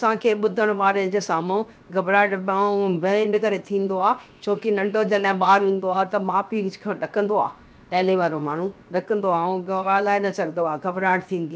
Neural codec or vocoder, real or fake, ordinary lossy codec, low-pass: codec, 16 kHz, 0.7 kbps, FocalCodec; fake; none; none